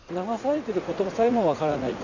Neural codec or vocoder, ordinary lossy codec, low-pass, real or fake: vocoder, 44.1 kHz, 80 mel bands, Vocos; none; 7.2 kHz; fake